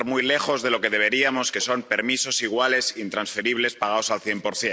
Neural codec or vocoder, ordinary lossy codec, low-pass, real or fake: none; none; none; real